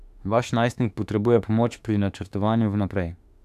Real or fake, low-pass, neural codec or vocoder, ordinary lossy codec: fake; 14.4 kHz; autoencoder, 48 kHz, 32 numbers a frame, DAC-VAE, trained on Japanese speech; none